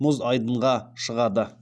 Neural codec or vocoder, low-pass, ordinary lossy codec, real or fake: none; none; none; real